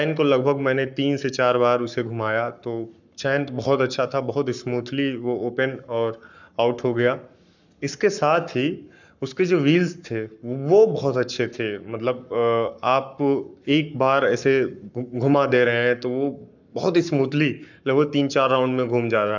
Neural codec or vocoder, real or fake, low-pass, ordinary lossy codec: codec, 44.1 kHz, 7.8 kbps, Pupu-Codec; fake; 7.2 kHz; none